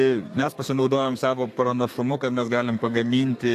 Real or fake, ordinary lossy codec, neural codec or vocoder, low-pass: fake; AAC, 64 kbps; codec, 32 kHz, 1.9 kbps, SNAC; 14.4 kHz